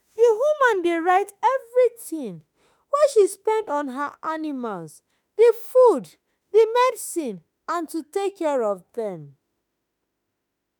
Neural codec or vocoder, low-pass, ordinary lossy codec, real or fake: autoencoder, 48 kHz, 32 numbers a frame, DAC-VAE, trained on Japanese speech; none; none; fake